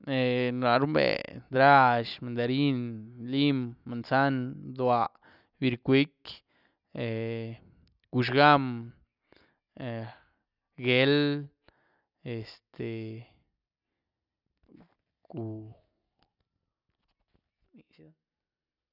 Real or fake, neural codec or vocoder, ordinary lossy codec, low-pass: real; none; none; 5.4 kHz